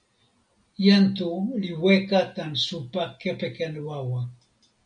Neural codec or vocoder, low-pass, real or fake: none; 9.9 kHz; real